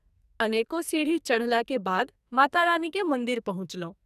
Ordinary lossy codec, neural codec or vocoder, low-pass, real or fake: none; codec, 44.1 kHz, 2.6 kbps, SNAC; 14.4 kHz; fake